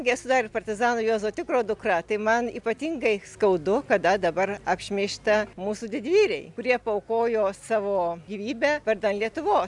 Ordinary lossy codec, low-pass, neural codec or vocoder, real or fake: MP3, 96 kbps; 10.8 kHz; none; real